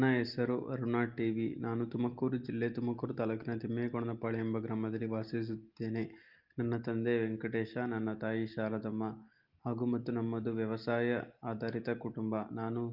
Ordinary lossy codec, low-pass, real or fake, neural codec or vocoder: Opus, 32 kbps; 5.4 kHz; real; none